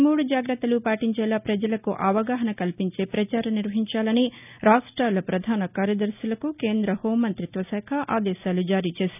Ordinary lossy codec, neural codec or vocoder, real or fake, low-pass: none; none; real; 3.6 kHz